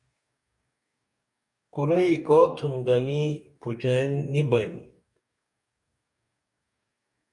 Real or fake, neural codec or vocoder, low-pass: fake; codec, 44.1 kHz, 2.6 kbps, DAC; 10.8 kHz